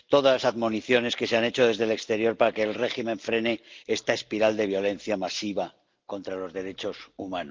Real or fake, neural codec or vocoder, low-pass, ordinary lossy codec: real; none; 7.2 kHz; Opus, 32 kbps